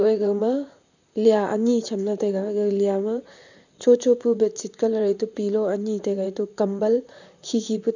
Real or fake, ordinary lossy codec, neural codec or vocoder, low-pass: fake; none; vocoder, 44.1 kHz, 128 mel bands, Pupu-Vocoder; 7.2 kHz